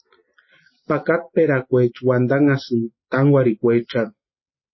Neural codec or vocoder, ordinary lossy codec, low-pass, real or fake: none; MP3, 24 kbps; 7.2 kHz; real